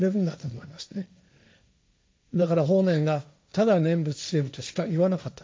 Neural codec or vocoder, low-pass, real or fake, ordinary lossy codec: codec, 16 kHz, 1.1 kbps, Voila-Tokenizer; none; fake; none